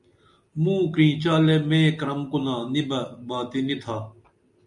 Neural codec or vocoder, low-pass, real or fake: none; 10.8 kHz; real